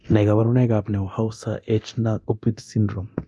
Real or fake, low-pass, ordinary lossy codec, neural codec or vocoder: fake; none; none; codec, 24 kHz, 0.9 kbps, DualCodec